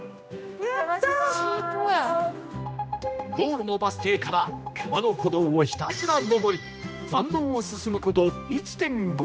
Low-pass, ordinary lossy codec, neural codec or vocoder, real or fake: none; none; codec, 16 kHz, 1 kbps, X-Codec, HuBERT features, trained on general audio; fake